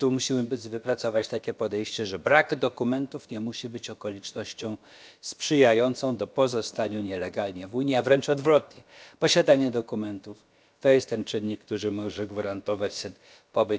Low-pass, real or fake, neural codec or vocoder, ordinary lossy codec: none; fake; codec, 16 kHz, about 1 kbps, DyCAST, with the encoder's durations; none